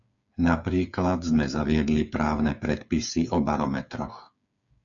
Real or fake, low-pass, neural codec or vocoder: fake; 7.2 kHz; codec, 16 kHz, 8 kbps, FreqCodec, smaller model